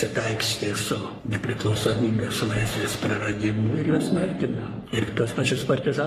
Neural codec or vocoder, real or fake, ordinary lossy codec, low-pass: codec, 44.1 kHz, 3.4 kbps, Pupu-Codec; fake; AAC, 48 kbps; 14.4 kHz